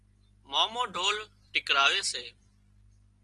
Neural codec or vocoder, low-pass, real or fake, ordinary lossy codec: none; 10.8 kHz; real; Opus, 24 kbps